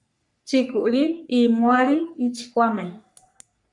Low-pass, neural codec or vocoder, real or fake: 10.8 kHz; codec, 44.1 kHz, 3.4 kbps, Pupu-Codec; fake